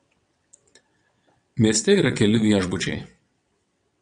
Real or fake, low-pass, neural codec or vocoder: fake; 9.9 kHz; vocoder, 22.05 kHz, 80 mel bands, WaveNeXt